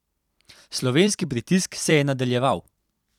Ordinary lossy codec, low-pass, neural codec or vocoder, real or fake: none; 19.8 kHz; vocoder, 44.1 kHz, 128 mel bands every 256 samples, BigVGAN v2; fake